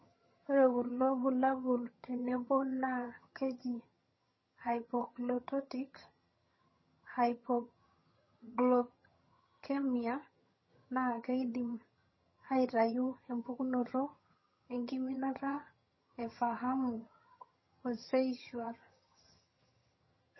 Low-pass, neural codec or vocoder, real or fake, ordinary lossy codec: 7.2 kHz; vocoder, 22.05 kHz, 80 mel bands, HiFi-GAN; fake; MP3, 24 kbps